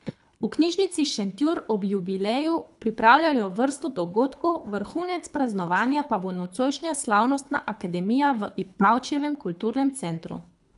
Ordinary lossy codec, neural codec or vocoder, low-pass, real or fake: none; codec, 24 kHz, 3 kbps, HILCodec; 10.8 kHz; fake